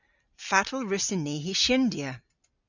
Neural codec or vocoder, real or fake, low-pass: none; real; 7.2 kHz